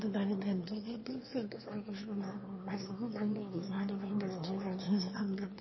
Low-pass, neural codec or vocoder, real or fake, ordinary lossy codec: 7.2 kHz; autoencoder, 22.05 kHz, a latent of 192 numbers a frame, VITS, trained on one speaker; fake; MP3, 24 kbps